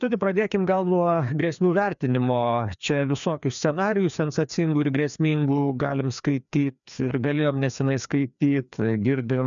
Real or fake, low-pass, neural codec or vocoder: fake; 7.2 kHz; codec, 16 kHz, 2 kbps, FreqCodec, larger model